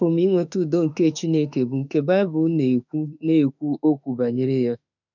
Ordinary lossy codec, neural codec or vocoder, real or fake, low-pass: none; autoencoder, 48 kHz, 32 numbers a frame, DAC-VAE, trained on Japanese speech; fake; 7.2 kHz